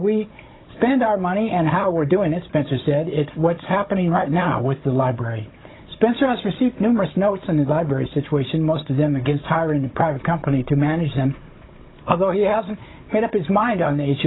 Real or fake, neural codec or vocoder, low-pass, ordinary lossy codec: fake; codec, 16 kHz, 16 kbps, FunCodec, trained on Chinese and English, 50 frames a second; 7.2 kHz; AAC, 16 kbps